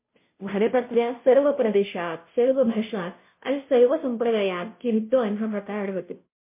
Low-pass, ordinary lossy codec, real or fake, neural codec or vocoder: 3.6 kHz; MP3, 24 kbps; fake; codec, 16 kHz, 0.5 kbps, FunCodec, trained on Chinese and English, 25 frames a second